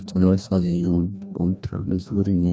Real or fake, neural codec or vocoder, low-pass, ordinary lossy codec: fake; codec, 16 kHz, 1 kbps, FreqCodec, larger model; none; none